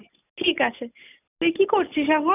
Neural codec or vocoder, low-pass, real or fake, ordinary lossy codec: none; 3.6 kHz; real; none